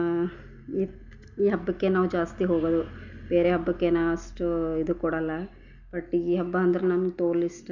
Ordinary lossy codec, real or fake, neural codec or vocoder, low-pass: none; real; none; 7.2 kHz